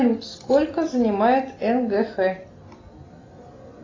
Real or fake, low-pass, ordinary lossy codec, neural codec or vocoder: real; 7.2 kHz; AAC, 32 kbps; none